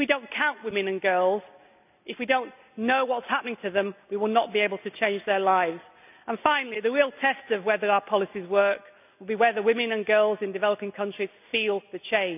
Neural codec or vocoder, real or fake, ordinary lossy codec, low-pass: none; real; none; 3.6 kHz